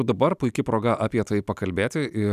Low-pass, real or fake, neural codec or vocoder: 14.4 kHz; real; none